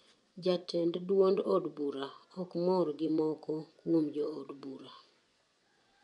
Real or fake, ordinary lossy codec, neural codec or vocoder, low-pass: fake; none; vocoder, 24 kHz, 100 mel bands, Vocos; 10.8 kHz